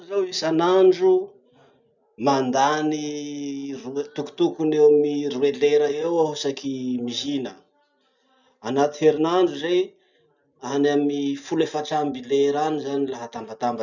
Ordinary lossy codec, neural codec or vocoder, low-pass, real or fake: none; none; 7.2 kHz; real